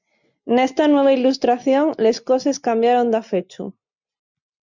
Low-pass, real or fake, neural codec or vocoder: 7.2 kHz; real; none